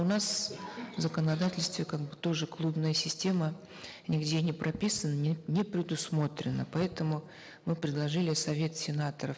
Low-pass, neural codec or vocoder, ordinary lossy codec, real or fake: none; none; none; real